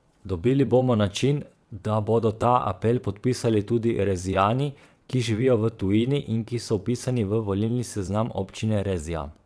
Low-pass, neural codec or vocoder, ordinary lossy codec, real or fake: none; vocoder, 22.05 kHz, 80 mel bands, WaveNeXt; none; fake